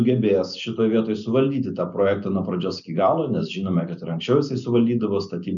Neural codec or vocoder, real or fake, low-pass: none; real; 7.2 kHz